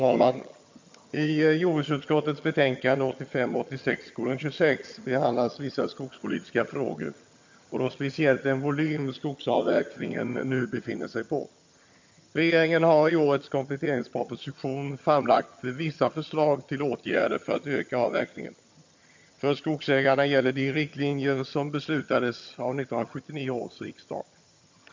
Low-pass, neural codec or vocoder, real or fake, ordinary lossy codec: 7.2 kHz; vocoder, 22.05 kHz, 80 mel bands, HiFi-GAN; fake; MP3, 48 kbps